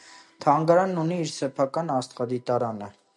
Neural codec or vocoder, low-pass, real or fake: none; 10.8 kHz; real